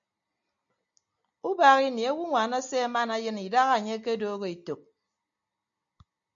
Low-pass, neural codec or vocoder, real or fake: 7.2 kHz; none; real